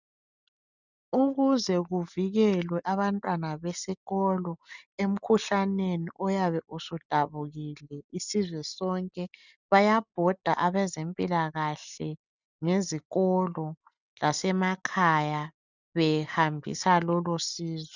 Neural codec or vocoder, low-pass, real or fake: none; 7.2 kHz; real